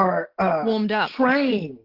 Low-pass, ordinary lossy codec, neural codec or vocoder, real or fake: 5.4 kHz; Opus, 16 kbps; none; real